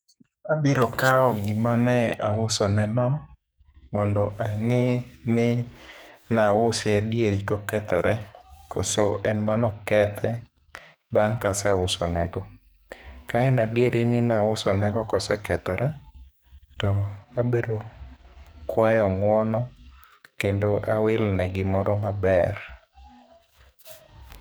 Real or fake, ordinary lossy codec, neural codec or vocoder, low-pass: fake; none; codec, 44.1 kHz, 2.6 kbps, SNAC; none